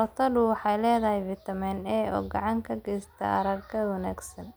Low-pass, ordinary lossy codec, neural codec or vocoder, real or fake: none; none; none; real